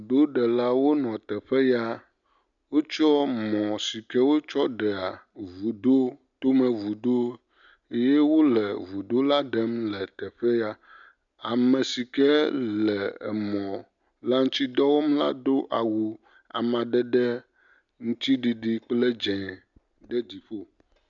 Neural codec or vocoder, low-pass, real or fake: none; 7.2 kHz; real